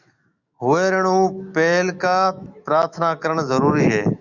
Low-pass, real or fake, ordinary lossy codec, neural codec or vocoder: 7.2 kHz; fake; Opus, 64 kbps; autoencoder, 48 kHz, 128 numbers a frame, DAC-VAE, trained on Japanese speech